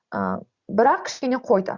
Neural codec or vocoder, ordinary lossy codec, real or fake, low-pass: none; Opus, 64 kbps; real; 7.2 kHz